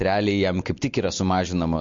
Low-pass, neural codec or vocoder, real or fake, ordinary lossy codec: 7.2 kHz; none; real; MP3, 48 kbps